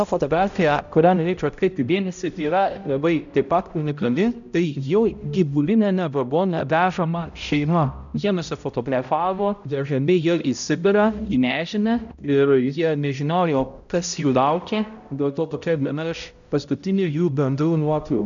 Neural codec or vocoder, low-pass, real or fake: codec, 16 kHz, 0.5 kbps, X-Codec, HuBERT features, trained on balanced general audio; 7.2 kHz; fake